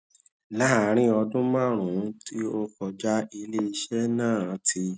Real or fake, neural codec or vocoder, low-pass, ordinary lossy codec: real; none; none; none